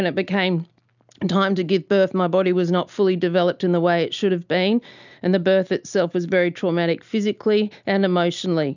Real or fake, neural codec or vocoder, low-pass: real; none; 7.2 kHz